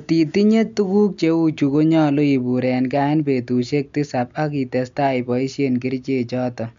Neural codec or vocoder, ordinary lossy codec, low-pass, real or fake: none; MP3, 64 kbps; 7.2 kHz; real